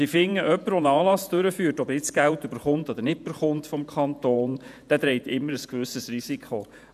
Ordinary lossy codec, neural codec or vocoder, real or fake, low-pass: none; vocoder, 48 kHz, 128 mel bands, Vocos; fake; 14.4 kHz